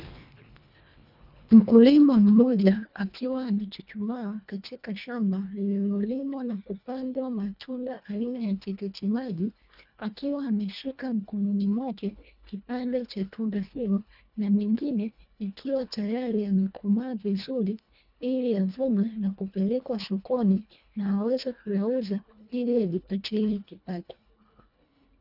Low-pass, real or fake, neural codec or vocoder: 5.4 kHz; fake; codec, 24 kHz, 1.5 kbps, HILCodec